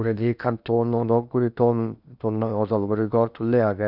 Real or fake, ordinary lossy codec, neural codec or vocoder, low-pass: fake; none; codec, 16 kHz in and 24 kHz out, 0.8 kbps, FocalCodec, streaming, 65536 codes; 5.4 kHz